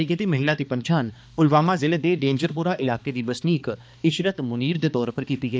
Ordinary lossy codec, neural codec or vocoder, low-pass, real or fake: none; codec, 16 kHz, 2 kbps, X-Codec, HuBERT features, trained on balanced general audio; none; fake